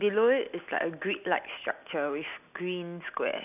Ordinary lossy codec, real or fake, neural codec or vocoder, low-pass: none; real; none; 3.6 kHz